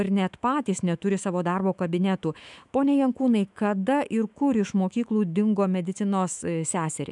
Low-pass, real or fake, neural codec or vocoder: 10.8 kHz; fake; autoencoder, 48 kHz, 128 numbers a frame, DAC-VAE, trained on Japanese speech